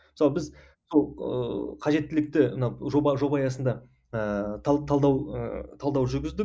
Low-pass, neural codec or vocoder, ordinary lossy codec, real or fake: none; none; none; real